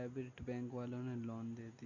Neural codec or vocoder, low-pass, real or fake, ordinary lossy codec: none; 7.2 kHz; real; none